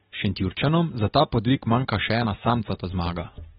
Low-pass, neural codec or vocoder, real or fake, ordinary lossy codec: 19.8 kHz; none; real; AAC, 16 kbps